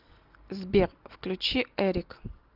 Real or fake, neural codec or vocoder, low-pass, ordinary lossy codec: real; none; 5.4 kHz; Opus, 24 kbps